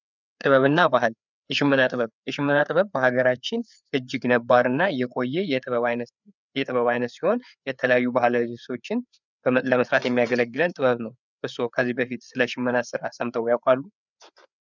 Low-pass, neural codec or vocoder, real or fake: 7.2 kHz; codec, 16 kHz, 4 kbps, FreqCodec, larger model; fake